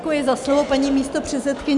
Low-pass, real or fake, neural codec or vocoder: 10.8 kHz; real; none